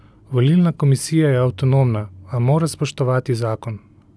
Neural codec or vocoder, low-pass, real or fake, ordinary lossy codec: none; none; real; none